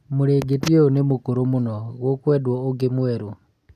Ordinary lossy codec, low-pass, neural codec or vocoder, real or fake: none; 14.4 kHz; none; real